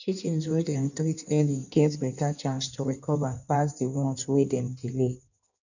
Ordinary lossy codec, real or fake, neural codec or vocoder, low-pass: none; fake; codec, 16 kHz in and 24 kHz out, 1.1 kbps, FireRedTTS-2 codec; 7.2 kHz